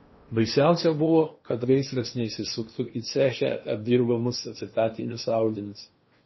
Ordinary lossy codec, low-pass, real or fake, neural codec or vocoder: MP3, 24 kbps; 7.2 kHz; fake; codec, 16 kHz in and 24 kHz out, 0.8 kbps, FocalCodec, streaming, 65536 codes